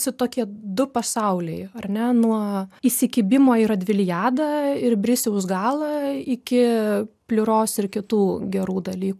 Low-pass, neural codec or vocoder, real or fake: 14.4 kHz; none; real